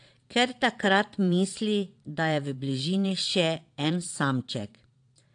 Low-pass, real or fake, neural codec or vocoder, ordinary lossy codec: 9.9 kHz; fake; vocoder, 22.05 kHz, 80 mel bands, Vocos; AAC, 64 kbps